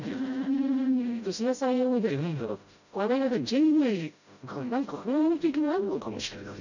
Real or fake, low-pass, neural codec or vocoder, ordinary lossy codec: fake; 7.2 kHz; codec, 16 kHz, 0.5 kbps, FreqCodec, smaller model; none